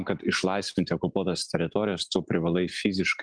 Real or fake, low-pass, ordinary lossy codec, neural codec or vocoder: real; 9.9 kHz; Opus, 24 kbps; none